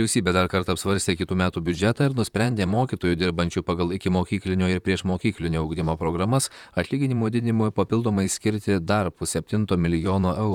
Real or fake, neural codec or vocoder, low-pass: fake; vocoder, 44.1 kHz, 128 mel bands, Pupu-Vocoder; 19.8 kHz